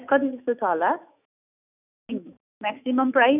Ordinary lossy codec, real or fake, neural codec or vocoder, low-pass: none; fake; autoencoder, 48 kHz, 128 numbers a frame, DAC-VAE, trained on Japanese speech; 3.6 kHz